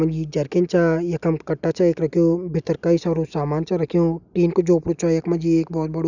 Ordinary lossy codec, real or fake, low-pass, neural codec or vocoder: none; real; 7.2 kHz; none